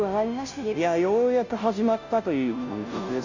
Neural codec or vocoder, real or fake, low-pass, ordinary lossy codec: codec, 16 kHz, 0.5 kbps, FunCodec, trained on Chinese and English, 25 frames a second; fake; 7.2 kHz; none